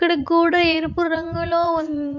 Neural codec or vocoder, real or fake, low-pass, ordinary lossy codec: vocoder, 44.1 kHz, 80 mel bands, Vocos; fake; 7.2 kHz; none